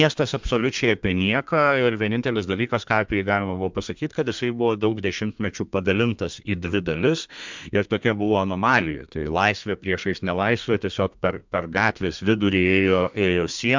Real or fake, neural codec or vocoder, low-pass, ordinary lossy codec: fake; codec, 32 kHz, 1.9 kbps, SNAC; 7.2 kHz; MP3, 64 kbps